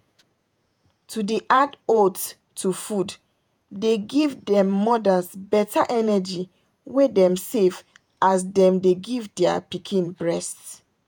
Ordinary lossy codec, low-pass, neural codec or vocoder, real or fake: none; none; vocoder, 48 kHz, 128 mel bands, Vocos; fake